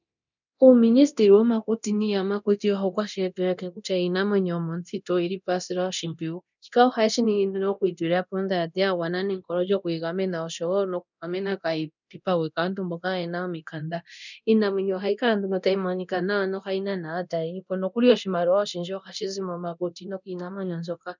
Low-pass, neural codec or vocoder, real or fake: 7.2 kHz; codec, 24 kHz, 0.9 kbps, DualCodec; fake